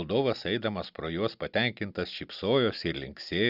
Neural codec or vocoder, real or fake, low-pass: none; real; 5.4 kHz